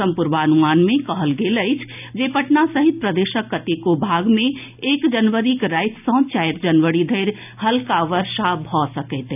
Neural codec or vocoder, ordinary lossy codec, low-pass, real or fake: none; none; 3.6 kHz; real